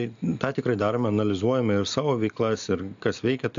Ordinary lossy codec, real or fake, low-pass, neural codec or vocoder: AAC, 64 kbps; real; 7.2 kHz; none